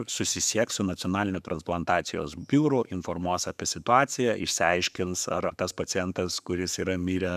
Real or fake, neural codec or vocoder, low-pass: fake; autoencoder, 48 kHz, 128 numbers a frame, DAC-VAE, trained on Japanese speech; 14.4 kHz